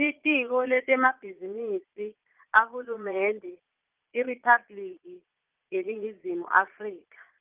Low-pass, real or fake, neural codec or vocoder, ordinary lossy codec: 3.6 kHz; fake; vocoder, 22.05 kHz, 80 mel bands, Vocos; Opus, 24 kbps